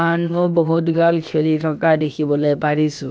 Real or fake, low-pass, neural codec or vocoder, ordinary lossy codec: fake; none; codec, 16 kHz, about 1 kbps, DyCAST, with the encoder's durations; none